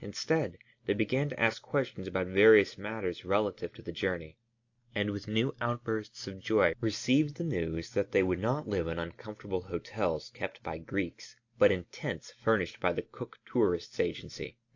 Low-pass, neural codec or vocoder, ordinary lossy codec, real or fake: 7.2 kHz; none; AAC, 48 kbps; real